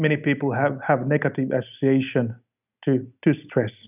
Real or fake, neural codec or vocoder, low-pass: real; none; 3.6 kHz